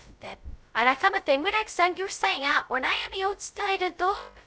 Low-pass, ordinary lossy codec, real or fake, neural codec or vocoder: none; none; fake; codec, 16 kHz, 0.2 kbps, FocalCodec